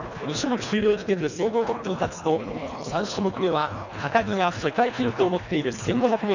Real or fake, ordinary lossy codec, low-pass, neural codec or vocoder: fake; none; 7.2 kHz; codec, 24 kHz, 1.5 kbps, HILCodec